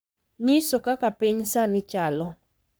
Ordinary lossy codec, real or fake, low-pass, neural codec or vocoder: none; fake; none; codec, 44.1 kHz, 3.4 kbps, Pupu-Codec